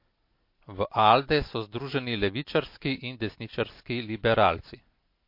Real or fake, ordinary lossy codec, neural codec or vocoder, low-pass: real; MP3, 32 kbps; none; 5.4 kHz